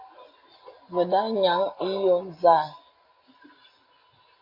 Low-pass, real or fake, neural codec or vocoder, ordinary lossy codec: 5.4 kHz; real; none; AAC, 32 kbps